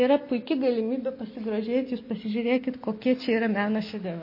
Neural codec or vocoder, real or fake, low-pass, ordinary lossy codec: none; real; 5.4 kHz; MP3, 24 kbps